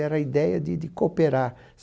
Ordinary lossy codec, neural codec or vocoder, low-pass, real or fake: none; none; none; real